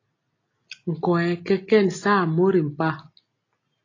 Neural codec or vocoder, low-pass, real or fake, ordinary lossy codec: none; 7.2 kHz; real; AAC, 32 kbps